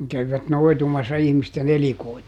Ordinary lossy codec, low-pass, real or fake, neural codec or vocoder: none; 19.8 kHz; real; none